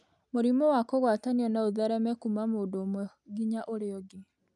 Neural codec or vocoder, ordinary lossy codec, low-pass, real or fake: none; none; none; real